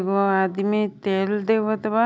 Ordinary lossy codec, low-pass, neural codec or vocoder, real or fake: none; none; none; real